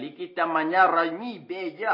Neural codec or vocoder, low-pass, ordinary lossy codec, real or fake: none; 5.4 kHz; MP3, 24 kbps; real